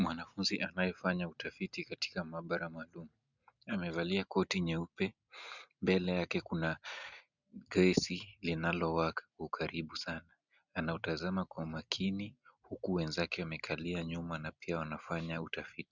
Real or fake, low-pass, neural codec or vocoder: real; 7.2 kHz; none